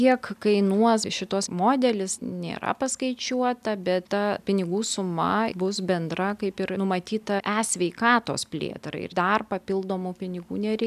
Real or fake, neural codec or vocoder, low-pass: real; none; 14.4 kHz